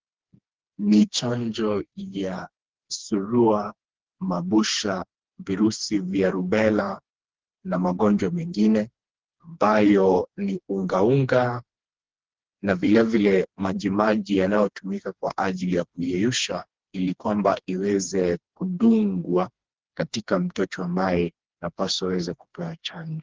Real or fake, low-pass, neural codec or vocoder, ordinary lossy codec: fake; 7.2 kHz; codec, 16 kHz, 2 kbps, FreqCodec, smaller model; Opus, 16 kbps